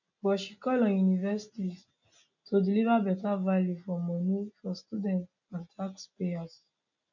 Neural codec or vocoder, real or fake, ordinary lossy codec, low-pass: none; real; none; 7.2 kHz